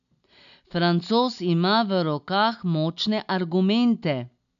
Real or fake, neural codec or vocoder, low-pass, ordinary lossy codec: real; none; 7.2 kHz; none